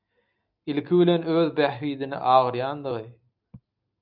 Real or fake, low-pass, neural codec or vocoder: real; 5.4 kHz; none